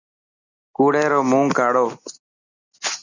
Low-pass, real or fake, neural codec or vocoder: 7.2 kHz; real; none